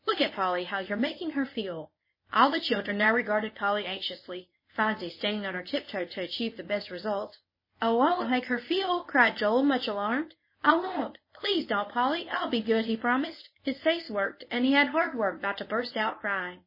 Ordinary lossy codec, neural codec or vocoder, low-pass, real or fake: MP3, 24 kbps; codec, 24 kHz, 0.9 kbps, WavTokenizer, medium speech release version 1; 5.4 kHz; fake